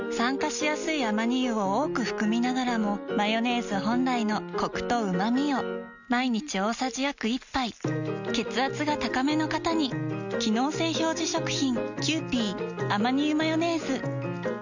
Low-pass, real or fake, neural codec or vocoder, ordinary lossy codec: 7.2 kHz; real; none; none